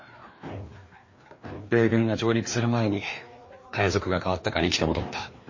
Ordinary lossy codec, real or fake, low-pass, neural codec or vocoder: MP3, 32 kbps; fake; 7.2 kHz; codec, 16 kHz, 2 kbps, FreqCodec, larger model